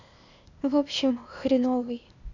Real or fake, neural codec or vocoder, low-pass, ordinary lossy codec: fake; codec, 16 kHz, 0.8 kbps, ZipCodec; 7.2 kHz; none